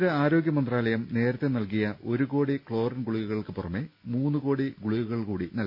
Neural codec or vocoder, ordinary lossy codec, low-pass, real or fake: none; none; 5.4 kHz; real